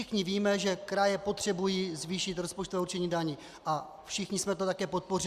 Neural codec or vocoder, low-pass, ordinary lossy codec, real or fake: none; 14.4 kHz; Opus, 64 kbps; real